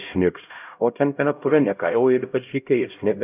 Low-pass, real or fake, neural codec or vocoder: 3.6 kHz; fake; codec, 16 kHz, 0.5 kbps, X-Codec, HuBERT features, trained on LibriSpeech